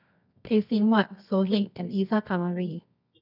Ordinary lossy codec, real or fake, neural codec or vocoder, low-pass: none; fake; codec, 24 kHz, 0.9 kbps, WavTokenizer, medium music audio release; 5.4 kHz